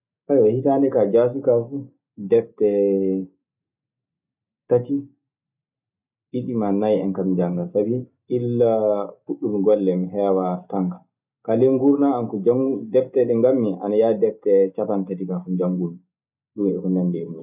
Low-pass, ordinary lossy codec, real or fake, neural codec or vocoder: 3.6 kHz; none; real; none